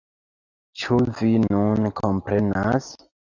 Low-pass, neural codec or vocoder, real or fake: 7.2 kHz; none; real